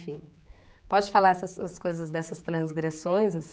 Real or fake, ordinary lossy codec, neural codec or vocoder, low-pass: fake; none; codec, 16 kHz, 4 kbps, X-Codec, HuBERT features, trained on general audio; none